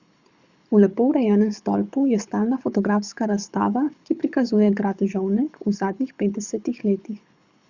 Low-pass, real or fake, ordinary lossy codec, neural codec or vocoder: 7.2 kHz; fake; Opus, 64 kbps; codec, 24 kHz, 6 kbps, HILCodec